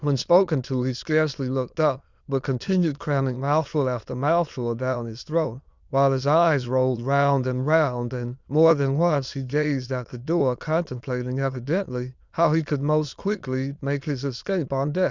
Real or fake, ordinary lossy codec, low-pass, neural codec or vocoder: fake; Opus, 64 kbps; 7.2 kHz; autoencoder, 22.05 kHz, a latent of 192 numbers a frame, VITS, trained on many speakers